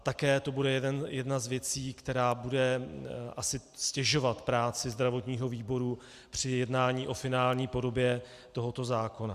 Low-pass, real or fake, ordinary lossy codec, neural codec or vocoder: 14.4 kHz; real; Opus, 64 kbps; none